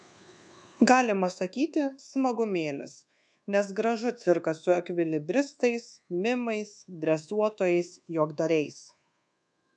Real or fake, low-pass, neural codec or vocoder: fake; 10.8 kHz; codec, 24 kHz, 1.2 kbps, DualCodec